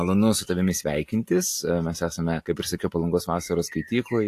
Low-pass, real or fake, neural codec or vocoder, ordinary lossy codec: 14.4 kHz; real; none; AAC, 64 kbps